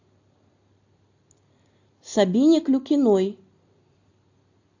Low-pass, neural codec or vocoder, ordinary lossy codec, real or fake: 7.2 kHz; none; AAC, 48 kbps; real